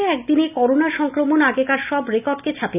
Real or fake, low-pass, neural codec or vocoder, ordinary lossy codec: real; 3.6 kHz; none; none